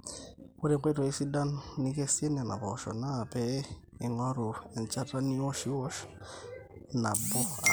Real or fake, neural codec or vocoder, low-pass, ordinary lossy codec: real; none; none; none